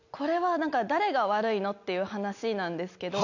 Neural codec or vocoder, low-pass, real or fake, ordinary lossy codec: none; 7.2 kHz; real; none